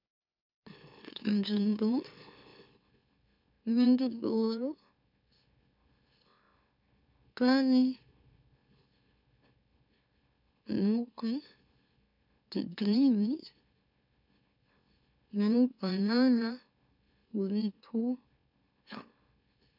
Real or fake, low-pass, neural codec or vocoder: fake; 5.4 kHz; autoencoder, 44.1 kHz, a latent of 192 numbers a frame, MeloTTS